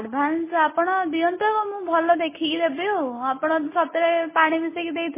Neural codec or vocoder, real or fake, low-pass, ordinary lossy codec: none; real; 3.6 kHz; MP3, 16 kbps